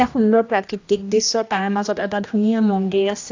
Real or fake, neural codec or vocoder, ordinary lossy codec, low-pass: fake; codec, 16 kHz, 1 kbps, X-Codec, HuBERT features, trained on general audio; AAC, 48 kbps; 7.2 kHz